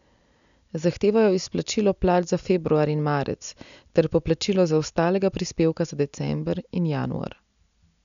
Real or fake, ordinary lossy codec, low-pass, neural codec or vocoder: real; none; 7.2 kHz; none